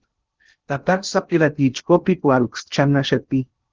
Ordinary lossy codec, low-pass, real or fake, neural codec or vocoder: Opus, 16 kbps; 7.2 kHz; fake; codec, 16 kHz in and 24 kHz out, 0.6 kbps, FocalCodec, streaming, 2048 codes